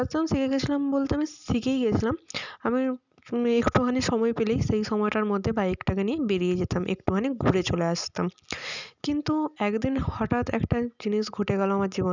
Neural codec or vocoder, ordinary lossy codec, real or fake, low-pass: none; none; real; 7.2 kHz